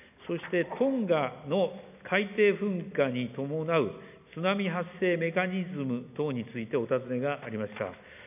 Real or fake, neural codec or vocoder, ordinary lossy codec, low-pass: real; none; none; 3.6 kHz